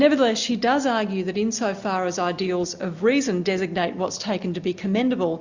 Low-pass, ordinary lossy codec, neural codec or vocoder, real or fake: 7.2 kHz; Opus, 64 kbps; none; real